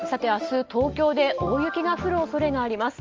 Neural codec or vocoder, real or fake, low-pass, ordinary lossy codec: none; real; 7.2 kHz; Opus, 24 kbps